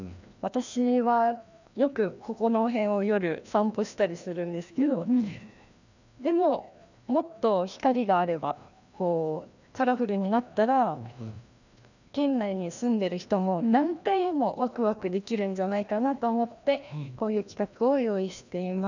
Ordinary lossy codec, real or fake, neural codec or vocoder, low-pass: none; fake; codec, 16 kHz, 1 kbps, FreqCodec, larger model; 7.2 kHz